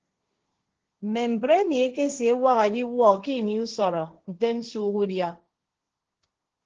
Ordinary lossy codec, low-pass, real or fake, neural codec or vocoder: Opus, 16 kbps; 7.2 kHz; fake; codec, 16 kHz, 1.1 kbps, Voila-Tokenizer